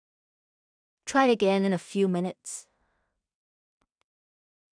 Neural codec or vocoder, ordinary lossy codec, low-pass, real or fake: codec, 16 kHz in and 24 kHz out, 0.4 kbps, LongCat-Audio-Codec, two codebook decoder; none; 9.9 kHz; fake